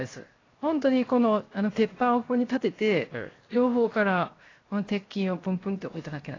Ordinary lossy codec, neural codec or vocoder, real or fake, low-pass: AAC, 32 kbps; codec, 16 kHz, 0.7 kbps, FocalCodec; fake; 7.2 kHz